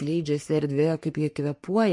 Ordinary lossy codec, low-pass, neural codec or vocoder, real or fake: MP3, 48 kbps; 10.8 kHz; codec, 44.1 kHz, 3.4 kbps, Pupu-Codec; fake